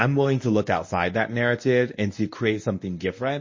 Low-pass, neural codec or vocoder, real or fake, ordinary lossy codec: 7.2 kHz; codec, 24 kHz, 0.9 kbps, WavTokenizer, medium speech release version 1; fake; MP3, 32 kbps